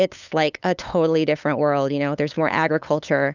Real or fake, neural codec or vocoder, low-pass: fake; codec, 16 kHz, 8 kbps, FunCodec, trained on LibriTTS, 25 frames a second; 7.2 kHz